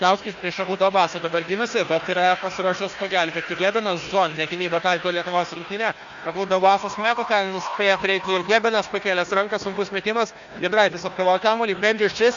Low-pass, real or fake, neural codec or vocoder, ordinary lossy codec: 7.2 kHz; fake; codec, 16 kHz, 1 kbps, FunCodec, trained on Chinese and English, 50 frames a second; Opus, 64 kbps